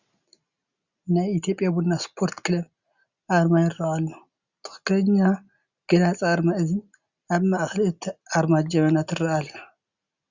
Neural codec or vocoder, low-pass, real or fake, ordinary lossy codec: none; 7.2 kHz; real; Opus, 64 kbps